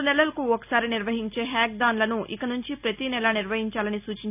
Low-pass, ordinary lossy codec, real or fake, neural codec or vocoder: 3.6 kHz; none; real; none